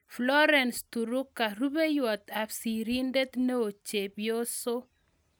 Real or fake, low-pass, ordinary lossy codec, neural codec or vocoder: real; none; none; none